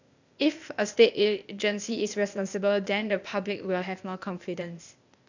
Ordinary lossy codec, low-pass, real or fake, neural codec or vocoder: none; 7.2 kHz; fake; codec, 16 kHz, 0.8 kbps, ZipCodec